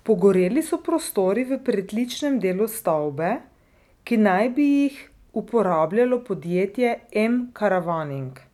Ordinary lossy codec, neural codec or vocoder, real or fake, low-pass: none; none; real; 19.8 kHz